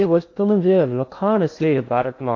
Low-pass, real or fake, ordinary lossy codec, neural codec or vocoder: 7.2 kHz; fake; AAC, 48 kbps; codec, 16 kHz in and 24 kHz out, 0.8 kbps, FocalCodec, streaming, 65536 codes